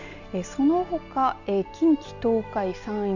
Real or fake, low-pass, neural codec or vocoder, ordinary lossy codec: real; 7.2 kHz; none; none